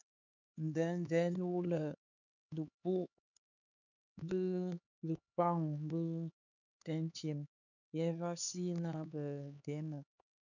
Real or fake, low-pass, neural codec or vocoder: fake; 7.2 kHz; codec, 16 kHz, 4 kbps, X-Codec, HuBERT features, trained on LibriSpeech